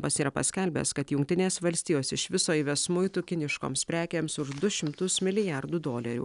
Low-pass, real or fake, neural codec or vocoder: 10.8 kHz; real; none